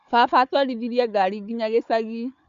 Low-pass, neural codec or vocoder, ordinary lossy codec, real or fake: 7.2 kHz; codec, 16 kHz, 4 kbps, FunCodec, trained on Chinese and English, 50 frames a second; Opus, 64 kbps; fake